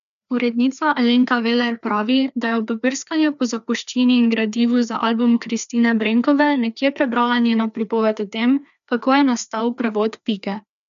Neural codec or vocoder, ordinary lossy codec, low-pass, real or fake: codec, 16 kHz, 2 kbps, FreqCodec, larger model; none; 7.2 kHz; fake